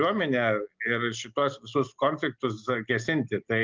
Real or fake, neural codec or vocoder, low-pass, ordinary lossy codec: real; none; 7.2 kHz; Opus, 32 kbps